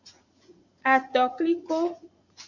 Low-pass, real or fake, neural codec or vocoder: 7.2 kHz; fake; vocoder, 44.1 kHz, 80 mel bands, Vocos